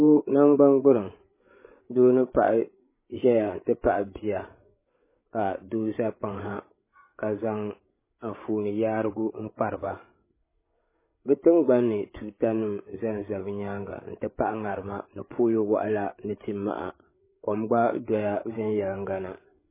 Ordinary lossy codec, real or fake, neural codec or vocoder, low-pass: MP3, 16 kbps; fake; codec, 16 kHz, 8 kbps, FreqCodec, larger model; 3.6 kHz